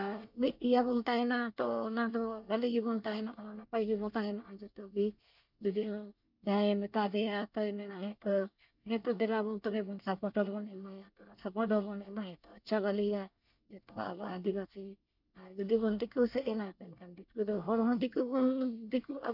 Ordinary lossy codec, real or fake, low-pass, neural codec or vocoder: none; fake; 5.4 kHz; codec, 24 kHz, 1 kbps, SNAC